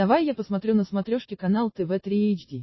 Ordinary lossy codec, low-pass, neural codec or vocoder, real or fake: MP3, 24 kbps; 7.2 kHz; none; real